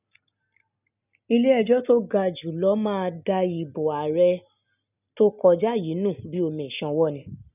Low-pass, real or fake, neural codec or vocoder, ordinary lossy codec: 3.6 kHz; real; none; none